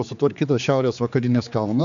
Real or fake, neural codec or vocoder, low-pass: fake; codec, 16 kHz, 4 kbps, X-Codec, HuBERT features, trained on general audio; 7.2 kHz